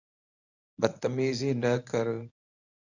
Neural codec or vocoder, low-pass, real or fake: codec, 24 kHz, 0.9 kbps, WavTokenizer, medium speech release version 1; 7.2 kHz; fake